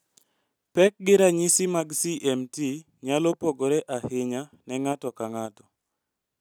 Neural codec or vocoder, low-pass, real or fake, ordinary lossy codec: none; none; real; none